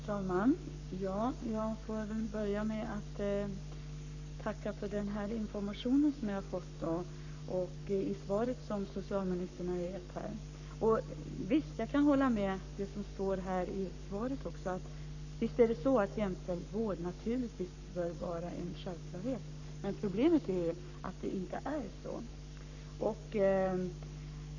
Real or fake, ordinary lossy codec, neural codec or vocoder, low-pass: fake; none; codec, 44.1 kHz, 7.8 kbps, Pupu-Codec; 7.2 kHz